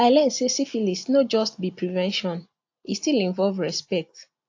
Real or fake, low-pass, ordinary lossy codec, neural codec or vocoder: real; 7.2 kHz; AAC, 48 kbps; none